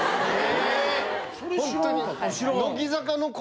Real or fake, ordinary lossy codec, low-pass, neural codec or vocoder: real; none; none; none